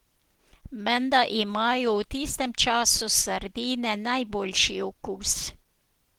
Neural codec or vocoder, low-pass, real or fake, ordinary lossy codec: none; 19.8 kHz; real; Opus, 16 kbps